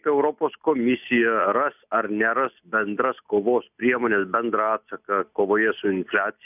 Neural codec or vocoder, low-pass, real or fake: none; 3.6 kHz; real